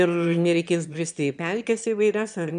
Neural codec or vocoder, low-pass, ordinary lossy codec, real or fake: autoencoder, 22.05 kHz, a latent of 192 numbers a frame, VITS, trained on one speaker; 9.9 kHz; MP3, 96 kbps; fake